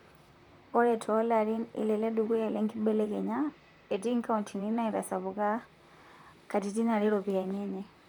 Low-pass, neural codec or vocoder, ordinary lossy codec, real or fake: 19.8 kHz; vocoder, 44.1 kHz, 128 mel bands, Pupu-Vocoder; none; fake